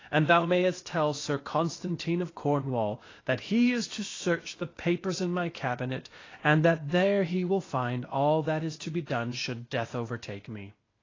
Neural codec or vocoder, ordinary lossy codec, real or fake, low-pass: codec, 16 kHz, 0.8 kbps, ZipCodec; AAC, 32 kbps; fake; 7.2 kHz